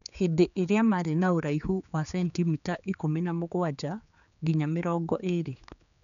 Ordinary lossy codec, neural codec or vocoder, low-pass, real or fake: none; codec, 16 kHz, 4 kbps, X-Codec, HuBERT features, trained on general audio; 7.2 kHz; fake